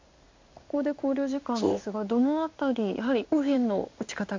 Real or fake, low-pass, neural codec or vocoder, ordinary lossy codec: fake; 7.2 kHz; codec, 16 kHz in and 24 kHz out, 1 kbps, XY-Tokenizer; MP3, 48 kbps